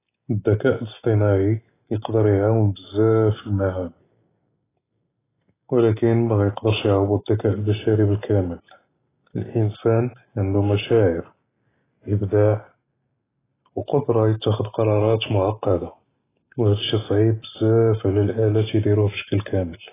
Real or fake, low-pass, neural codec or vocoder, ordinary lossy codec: real; 3.6 kHz; none; AAC, 16 kbps